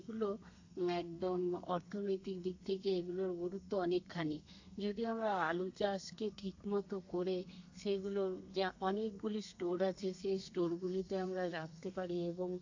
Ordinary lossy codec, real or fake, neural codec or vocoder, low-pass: AAC, 48 kbps; fake; codec, 32 kHz, 1.9 kbps, SNAC; 7.2 kHz